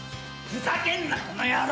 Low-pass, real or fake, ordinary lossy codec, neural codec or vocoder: none; real; none; none